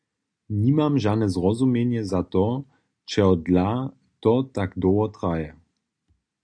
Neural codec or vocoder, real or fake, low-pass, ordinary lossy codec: none; real; 9.9 kHz; AAC, 64 kbps